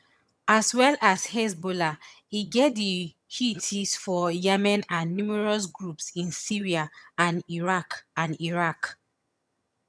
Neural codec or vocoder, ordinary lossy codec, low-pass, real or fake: vocoder, 22.05 kHz, 80 mel bands, HiFi-GAN; none; none; fake